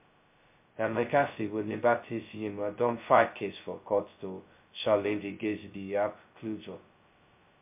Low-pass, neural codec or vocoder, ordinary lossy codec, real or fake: 3.6 kHz; codec, 16 kHz, 0.2 kbps, FocalCodec; MP3, 32 kbps; fake